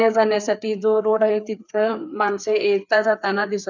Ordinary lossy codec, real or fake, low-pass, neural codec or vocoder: none; fake; 7.2 kHz; codec, 16 kHz, 4 kbps, FreqCodec, larger model